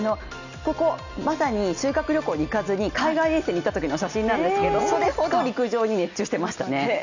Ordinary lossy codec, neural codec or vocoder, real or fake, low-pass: none; none; real; 7.2 kHz